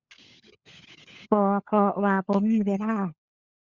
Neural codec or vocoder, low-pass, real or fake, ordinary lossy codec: codec, 16 kHz, 16 kbps, FunCodec, trained on LibriTTS, 50 frames a second; 7.2 kHz; fake; Opus, 64 kbps